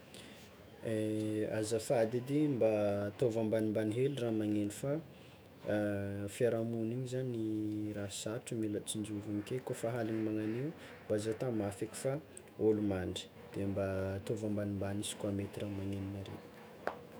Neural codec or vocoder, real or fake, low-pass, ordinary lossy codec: autoencoder, 48 kHz, 128 numbers a frame, DAC-VAE, trained on Japanese speech; fake; none; none